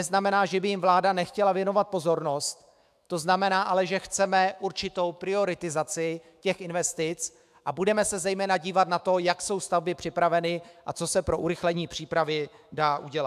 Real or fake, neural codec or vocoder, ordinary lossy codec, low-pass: fake; autoencoder, 48 kHz, 128 numbers a frame, DAC-VAE, trained on Japanese speech; AAC, 96 kbps; 14.4 kHz